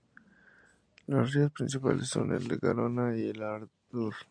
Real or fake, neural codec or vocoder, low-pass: real; none; 9.9 kHz